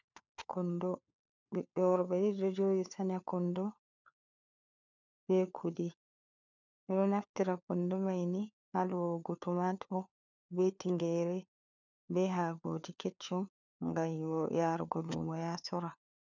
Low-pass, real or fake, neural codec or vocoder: 7.2 kHz; fake; codec, 16 kHz, 4 kbps, FunCodec, trained on LibriTTS, 50 frames a second